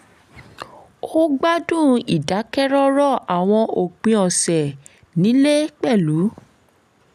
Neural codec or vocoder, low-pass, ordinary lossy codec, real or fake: none; 14.4 kHz; none; real